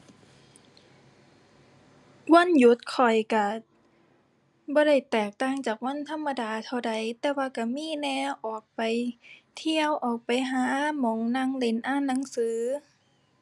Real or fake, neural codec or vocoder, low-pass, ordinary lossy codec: real; none; none; none